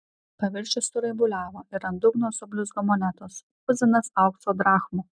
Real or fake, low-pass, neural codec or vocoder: real; 9.9 kHz; none